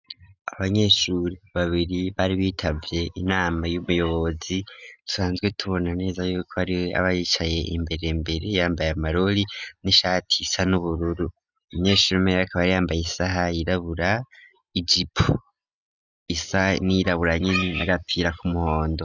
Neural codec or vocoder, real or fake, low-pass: none; real; 7.2 kHz